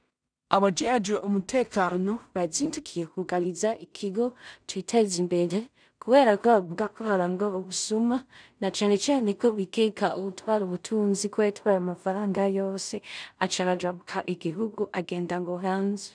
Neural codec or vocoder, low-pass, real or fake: codec, 16 kHz in and 24 kHz out, 0.4 kbps, LongCat-Audio-Codec, two codebook decoder; 9.9 kHz; fake